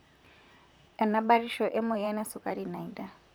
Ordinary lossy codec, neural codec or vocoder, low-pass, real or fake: none; vocoder, 44.1 kHz, 128 mel bands, Pupu-Vocoder; none; fake